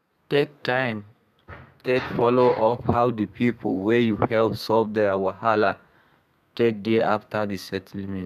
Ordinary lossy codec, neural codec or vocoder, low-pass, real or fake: none; codec, 32 kHz, 1.9 kbps, SNAC; 14.4 kHz; fake